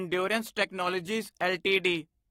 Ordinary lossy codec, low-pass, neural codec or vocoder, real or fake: AAC, 48 kbps; 19.8 kHz; codec, 44.1 kHz, 7.8 kbps, Pupu-Codec; fake